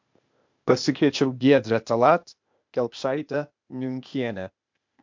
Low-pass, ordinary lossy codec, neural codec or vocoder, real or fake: 7.2 kHz; MP3, 64 kbps; codec, 16 kHz, 0.8 kbps, ZipCodec; fake